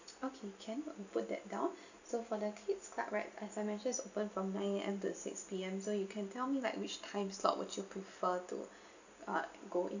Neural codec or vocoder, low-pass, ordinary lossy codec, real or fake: none; 7.2 kHz; none; real